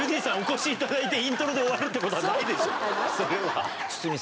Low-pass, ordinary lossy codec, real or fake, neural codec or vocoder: none; none; real; none